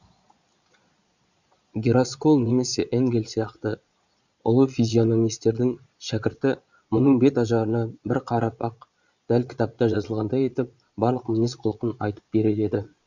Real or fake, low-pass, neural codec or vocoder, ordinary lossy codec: fake; 7.2 kHz; vocoder, 22.05 kHz, 80 mel bands, Vocos; none